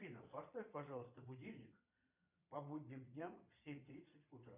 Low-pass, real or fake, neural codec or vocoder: 3.6 kHz; fake; vocoder, 22.05 kHz, 80 mel bands, Vocos